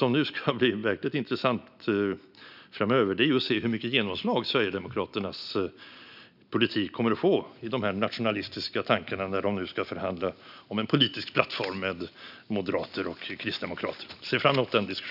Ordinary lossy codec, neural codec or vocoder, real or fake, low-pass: none; none; real; 5.4 kHz